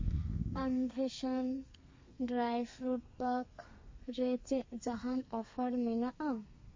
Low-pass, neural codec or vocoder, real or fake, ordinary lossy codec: 7.2 kHz; codec, 32 kHz, 1.9 kbps, SNAC; fake; MP3, 32 kbps